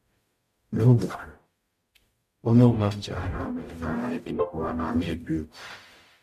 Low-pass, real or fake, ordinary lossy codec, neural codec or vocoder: 14.4 kHz; fake; MP3, 64 kbps; codec, 44.1 kHz, 0.9 kbps, DAC